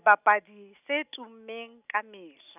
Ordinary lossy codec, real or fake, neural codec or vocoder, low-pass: none; real; none; 3.6 kHz